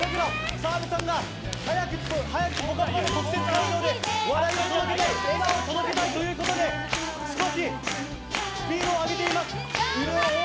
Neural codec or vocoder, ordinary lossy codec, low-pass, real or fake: none; none; none; real